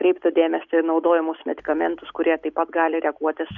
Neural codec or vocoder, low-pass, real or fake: none; 7.2 kHz; real